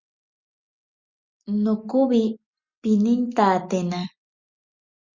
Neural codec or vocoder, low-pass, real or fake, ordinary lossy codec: none; 7.2 kHz; real; Opus, 64 kbps